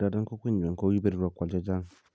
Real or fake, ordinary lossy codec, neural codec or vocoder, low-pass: real; none; none; none